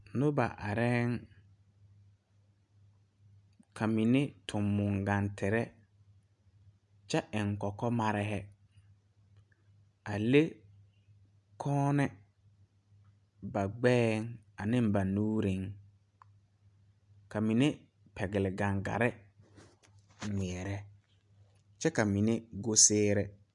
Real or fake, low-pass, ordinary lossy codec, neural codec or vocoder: real; 10.8 kHz; MP3, 96 kbps; none